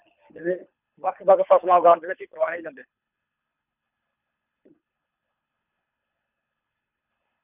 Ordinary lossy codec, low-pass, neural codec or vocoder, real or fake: none; 3.6 kHz; codec, 24 kHz, 3 kbps, HILCodec; fake